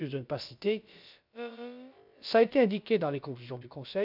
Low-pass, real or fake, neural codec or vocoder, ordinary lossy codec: 5.4 kHz; fake; codec, 16 kHz, about 1 kbps, DyCAST, with the encoder's durations; none